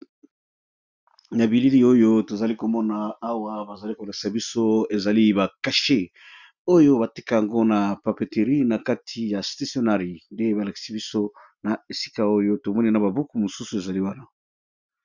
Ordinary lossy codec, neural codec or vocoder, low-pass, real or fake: Opus, 64 kbps; none; 7.2 kHz; real